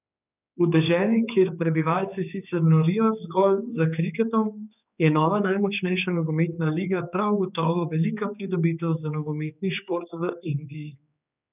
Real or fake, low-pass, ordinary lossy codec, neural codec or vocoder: fake; 3.6 kHz; none; codec, 16 kHz, 4 kbps, X-Codec, HuBERT features, trained on general audio